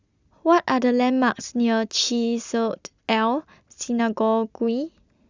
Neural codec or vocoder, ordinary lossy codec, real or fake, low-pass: none; Opus, 64 kbps; real; 7.2 kHz